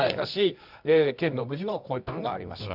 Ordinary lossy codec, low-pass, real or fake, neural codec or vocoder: none; 5.4 kHz; fake; codec, 24 kHz, 0.9 kbps, WavTokenizer, medium music audio release